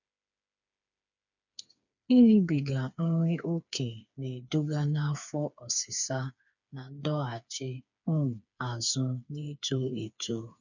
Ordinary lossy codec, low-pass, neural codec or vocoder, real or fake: none; 7.2 kHz; codec, 16 kHz, 4 kbps, FreqCodec, smaller model; fake